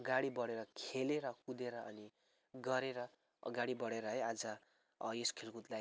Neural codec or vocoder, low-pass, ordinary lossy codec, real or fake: none; none; none; real